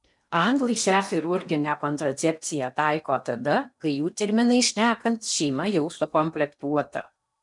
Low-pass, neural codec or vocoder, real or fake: 10.8 kHz; codec, 16 kHz in and 24 kHz out, 0.8 kbps, FocalCodec, streaming, 65536 codes; fake